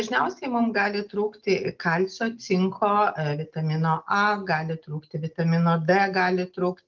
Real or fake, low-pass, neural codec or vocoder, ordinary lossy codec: real; 7.2 kHz; none; Opus, 32 kbps